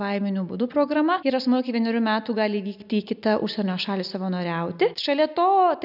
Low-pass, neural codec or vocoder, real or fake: 5.4 kHz; none; real